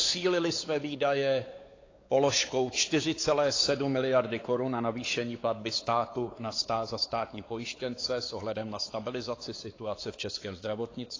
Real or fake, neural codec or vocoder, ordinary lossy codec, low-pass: fake; codec, 16 kHz, 4 kbps, X-Codec, WavLM features, trained on Multilingual LibriSpeech; AAC, 32 kbps; 7.2 kHz